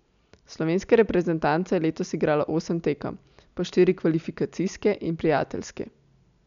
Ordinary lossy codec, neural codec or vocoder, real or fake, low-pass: none; none; real; 7.2 kHz